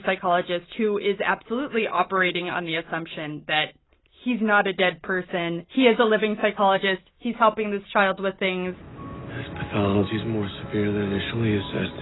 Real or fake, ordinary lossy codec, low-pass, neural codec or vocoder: real; AAC, 16 kbps; 7.2 kHz; none